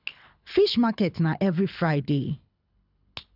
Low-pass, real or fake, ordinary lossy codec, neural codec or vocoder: 5.4 kHz; fake; none; codec, 24 kHz, 6 kbps, HILCodec